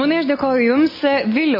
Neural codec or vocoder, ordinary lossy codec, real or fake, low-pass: none; MP3, 24 kbps; real; 5.4 kHz